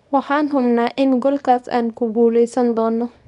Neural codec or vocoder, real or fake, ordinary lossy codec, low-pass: codec, 24 kHz, 0.9 kbps, WavTokenizer, small release; fake; none; 10.8 kHz